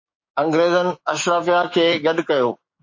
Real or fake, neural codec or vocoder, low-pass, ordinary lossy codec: fake; codec, 16 kHz, 6 kbps, DAC; 7.2 kHz; MP3, 32 kbps